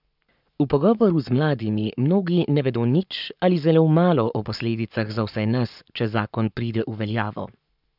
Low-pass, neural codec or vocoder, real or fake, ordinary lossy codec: 5.4 kHz; codec, 44.1 kHz, 7.8 kbps, Pupu-Codec; fake; none